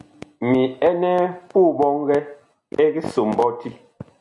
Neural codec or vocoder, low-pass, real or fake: none; 10.8 kHz; real